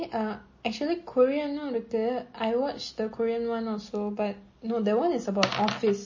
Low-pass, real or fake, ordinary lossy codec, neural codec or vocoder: 7.2 kHz; real; MP3, 32 kbps; none